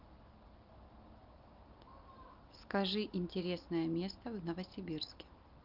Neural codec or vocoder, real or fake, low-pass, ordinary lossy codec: none; real; 5.4 kHz; Opus, 32 kbps